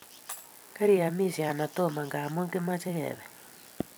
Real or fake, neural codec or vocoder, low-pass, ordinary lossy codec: fake; vocoder, 44.1 kHz, 128 mel bands every 256 samples, BigVGAN v2; none; none